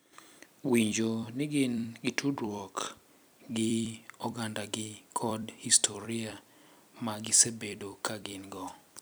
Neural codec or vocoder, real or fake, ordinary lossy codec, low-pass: vocoder, 44.1 kHz, 128 mel bands every 256 samples, BigVGAN v2; fake; none; none